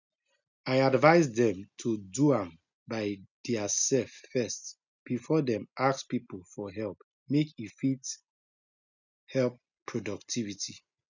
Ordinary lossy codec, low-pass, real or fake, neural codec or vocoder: none; 7.2 kHz; real; none